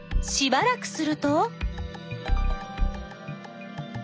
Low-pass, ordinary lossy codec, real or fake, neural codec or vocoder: none; none; real; none